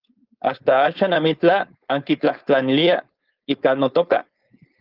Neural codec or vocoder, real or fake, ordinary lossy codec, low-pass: codec, 16 kHz, 4.8 kbps, FACodec; fake; Opus, 32 kbps; 5.4 kHz